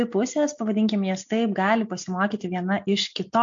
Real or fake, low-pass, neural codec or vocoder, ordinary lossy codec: real; 7.2 kHz; none; MP3, 48 kbps